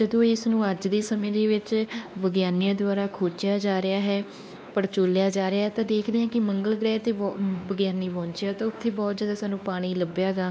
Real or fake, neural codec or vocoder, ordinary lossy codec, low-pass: fake; codec, 16 kHz, 2 kbps, X-Codec, WavLM features, trained on Multilingual LibriSpeech; none; none